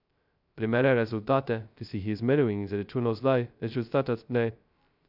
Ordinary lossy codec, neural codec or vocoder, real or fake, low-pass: none; codec, 16 kHz, 0.2 kbps, FocalCodec; fake; 5.4 kHz